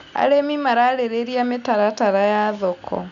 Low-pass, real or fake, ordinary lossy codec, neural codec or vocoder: 7.2 kHz; real; none; none